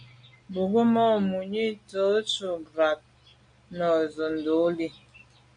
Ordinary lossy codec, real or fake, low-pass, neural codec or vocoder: MP3, 64 kbps; real; 9.9 kHz; none